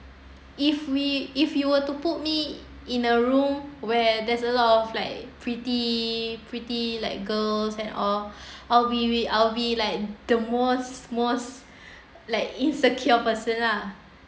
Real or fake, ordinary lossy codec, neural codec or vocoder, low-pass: real; none; none; none